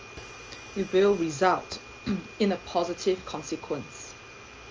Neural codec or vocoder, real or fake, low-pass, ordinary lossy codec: none; real; 7.2 kHz; Opus, 24 kbps